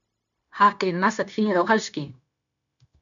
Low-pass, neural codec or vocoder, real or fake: 7.2 kHz; codec, 16 kHz, 0.9 kbps, LongCat-Audio-Codec; fake